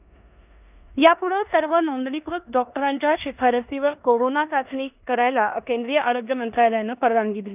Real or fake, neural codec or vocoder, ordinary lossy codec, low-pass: fake; codec, 16 kHz in and 24 kHz out, 0.9 kbps, LongCat-Audio-Codec, four codebook decoder; none; 3.6 kHz